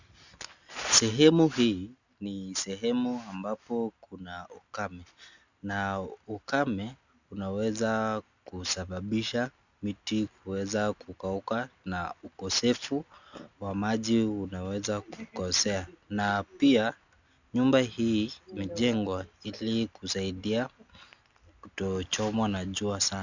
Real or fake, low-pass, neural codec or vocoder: real; 7.2 kHz; none